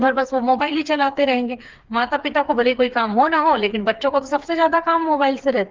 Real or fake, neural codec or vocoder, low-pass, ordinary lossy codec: fake; codec, 16 kHz, 4 kbps, FreqCodec, smaller model; 7.2 kHz; Opus, 32 kbps